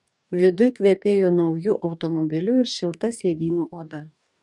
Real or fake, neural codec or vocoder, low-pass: fake; codec, 44.1 kHz, 2.6 kbps, DAC; 10.8 kHz